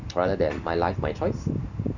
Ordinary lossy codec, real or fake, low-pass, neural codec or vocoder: none; real; 7.2 kHz; none